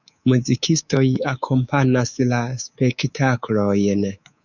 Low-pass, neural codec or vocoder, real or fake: 7.2 kHz; codec, 24 kHz, 3.1 kbps, DualCodec; fake